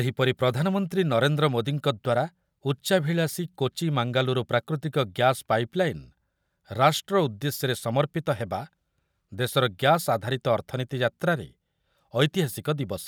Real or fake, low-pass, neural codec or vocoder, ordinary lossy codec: real; none; none; none